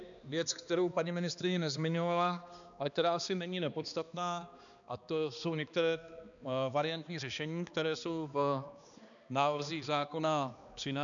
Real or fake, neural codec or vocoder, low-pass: fake; codec, 16 kHz, 2 kbps, X-Codec, HuBERT features, trained on balanced general audio; 7.2 kHz